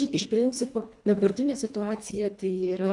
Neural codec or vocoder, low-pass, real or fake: codec, 24 kHz, 1.5 kbps, HILCodec; 10.8 kHz; fake